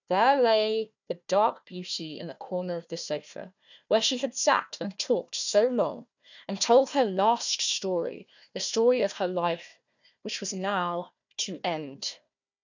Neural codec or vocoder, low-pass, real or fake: codec, 16 kHz, 1 kbps, FunCodec, trained on Chinese and English, 50 frames a second; 7.2 kHz; fake